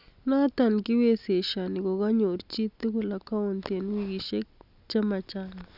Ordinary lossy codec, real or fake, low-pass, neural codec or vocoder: none; real; 5.4 kHz; none